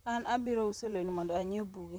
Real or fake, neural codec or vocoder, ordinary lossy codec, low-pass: fake; vocoder, 44.1 kHz, 128 mel bands, Pupu-Vocoder; none; none